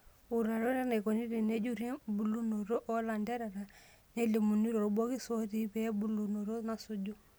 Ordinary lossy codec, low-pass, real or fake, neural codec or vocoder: none; none; real; none